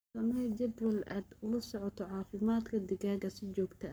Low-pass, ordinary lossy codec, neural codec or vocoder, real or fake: none; none; codec, 44.1 kHz, 7.8 kbps, Pupu-Codec; fake